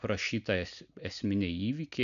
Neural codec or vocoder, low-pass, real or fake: none; 7.2 kHz; real